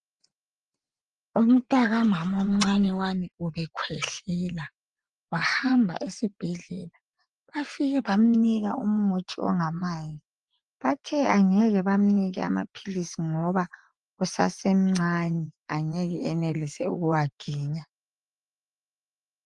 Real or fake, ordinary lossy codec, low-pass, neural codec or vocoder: fake; Opus, 24 kbps; 10.8 kHz; vocoder, 24 kHz, 100 mel bands, Vocos